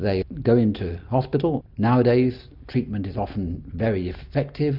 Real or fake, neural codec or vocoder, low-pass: real; none; 5.4 kHz